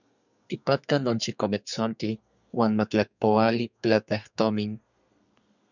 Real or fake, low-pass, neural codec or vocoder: fake; 7.2 kHz; codec, 32 kHz, 1.9 kbps, SNAC